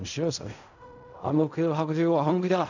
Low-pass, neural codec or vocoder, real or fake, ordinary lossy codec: 7.2 kHz; codec, 16 kHz in and 24 kHz out, 0.4 kbps, LongCat-Audio-Codec, fine tuned four codebook decoder; fake; none